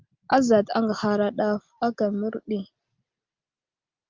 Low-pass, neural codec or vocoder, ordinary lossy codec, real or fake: 7.2 kHz; none; Opus, 32 kbps; real